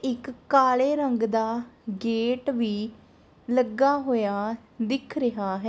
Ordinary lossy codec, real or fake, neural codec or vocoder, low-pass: none; real; none; none